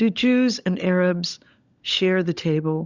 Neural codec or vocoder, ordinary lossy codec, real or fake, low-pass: codec, 16 kHz, 16 kbps, FunCodec, trained on LibriTTS, 50 frames a second; Opus, 64 kbps; fake; 7.2 kHz